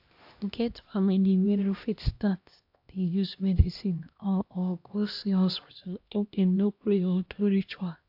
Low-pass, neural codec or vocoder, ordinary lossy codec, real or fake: 5.4 kHz; codec, 16 kHz, 1 kbps, X-Codec, HuBERT features, trained on LibriSpeech; AAC, 48 kbps; fake